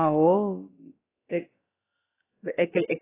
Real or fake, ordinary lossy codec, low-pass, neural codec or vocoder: fake; AAC, 16 kbps; 3.6 kHz; codec, 16 kHz, about 1 kbps, DyCAST, with the encoder's durations